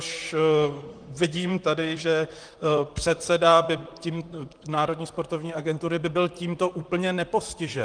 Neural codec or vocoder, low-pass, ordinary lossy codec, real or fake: vocoder, 44.1 kHz, 128 mel bands, Pupu-Vocoder; 9.9 kHz; Opus, 32 kbps; fake